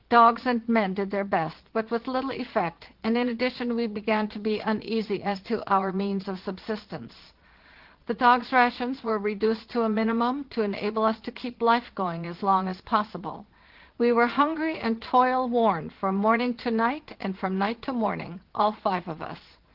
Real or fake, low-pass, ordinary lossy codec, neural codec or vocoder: fake; 5.4 kHz; Opus, 16 kbps; vocoder, 22.05 kHz, 80 mel bands, Vocos